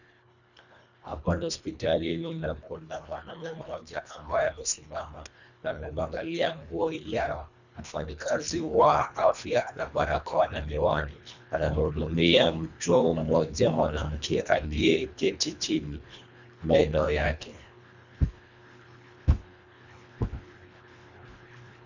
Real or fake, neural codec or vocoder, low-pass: fake; codec, 24 kHz, 1.5 kbps, HILCodec; 7.2 kHz